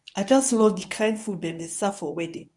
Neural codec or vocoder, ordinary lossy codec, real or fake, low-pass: codec, 24 kHz, 0.9 kbps, WavTokenizer, medium speech release version 1; none; fake; 10.8 kHz